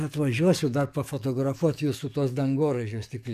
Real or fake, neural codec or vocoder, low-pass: fake; codec, 44.1 kHz, 7.8 kbps, DAC; 14.4 kHz